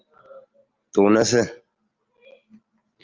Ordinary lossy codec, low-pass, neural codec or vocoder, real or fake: Opus, 24 kbps; 7.2 kHz; none; real